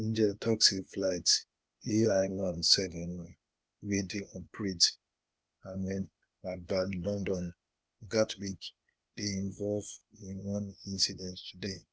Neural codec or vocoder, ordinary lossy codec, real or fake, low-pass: codec, 16 kHz, 0.8 kbps, ZipCodec; none; fake; none